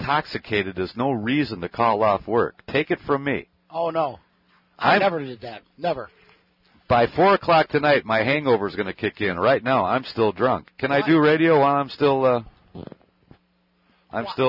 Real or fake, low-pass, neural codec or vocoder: real; 5.4 kHz; none